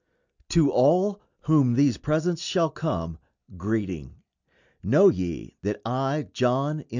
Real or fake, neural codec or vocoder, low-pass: real; none; 7.2 kHz